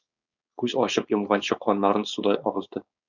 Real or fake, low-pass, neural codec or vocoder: fake; 7.2 kHz; codec, 16 kHz, 4.8 kbps, FACodec